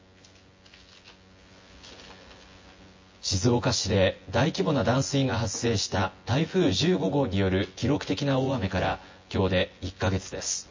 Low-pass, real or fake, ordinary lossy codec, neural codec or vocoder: 7.2 kHz; fake; MP3, 32 kbps; vocoder, 24 kHz, 100 mel bands, Vocos